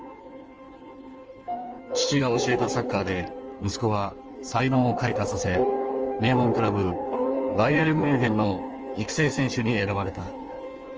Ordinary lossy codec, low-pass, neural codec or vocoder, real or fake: Opus, 24 kbps; 7.2 kHz; codec, 16 kHz in and 24 kHz out, 1.1 kbps, FireRedTTS-2 codec; fake